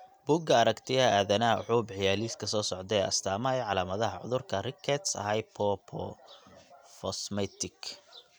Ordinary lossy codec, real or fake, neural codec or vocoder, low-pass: none; real; none; none